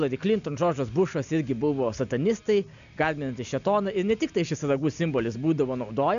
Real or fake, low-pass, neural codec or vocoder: real; 7.2 kHz; none